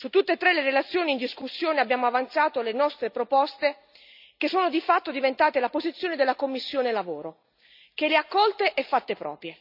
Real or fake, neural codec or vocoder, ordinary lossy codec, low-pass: real; none; none; 5.4 kHz